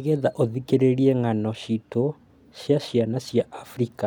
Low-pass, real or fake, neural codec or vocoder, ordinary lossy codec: 19.8 kHz; real; none; none